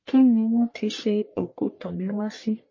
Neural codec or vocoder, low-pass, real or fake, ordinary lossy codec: codec, 44.1 kHz, 1.7 kbps, Pupu-Codec; 7.2 kHz; fake; MP3, 32 kbps